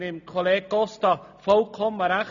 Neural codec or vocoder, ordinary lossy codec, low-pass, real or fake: none; none; 7.2 kHz; real